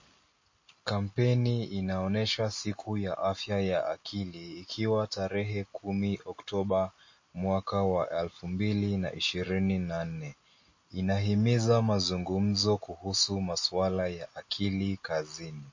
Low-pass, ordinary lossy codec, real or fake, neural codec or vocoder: 7.2 kHz; MP3, 32 kbps; real; none